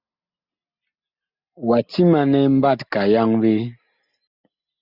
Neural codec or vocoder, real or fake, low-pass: none; real; 5.4 kHz